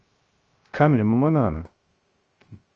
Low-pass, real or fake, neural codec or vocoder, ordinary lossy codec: 7.2 kHz; fake; codec, 16 kHz, 0.3 kbps, FocalCodec; Opus, 24 kbps